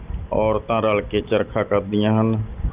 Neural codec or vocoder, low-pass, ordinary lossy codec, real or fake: none; 3.6 kHz; Opus, 24 kbps; real